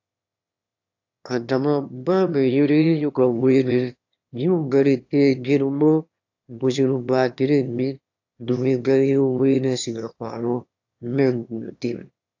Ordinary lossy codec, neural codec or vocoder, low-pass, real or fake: AAC, 48 kbps; autoencoder, 22.05 kHz, a latent of 192 numbers a frame, VITS, trained on one speaker; 7.2 kHz; fake